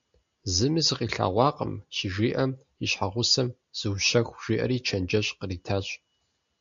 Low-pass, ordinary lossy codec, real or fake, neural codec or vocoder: 7.2 kHz; MP3, 64 kbps; real; none